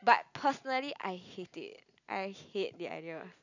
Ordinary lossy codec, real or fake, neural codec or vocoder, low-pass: none; real; none; 7.2 kHz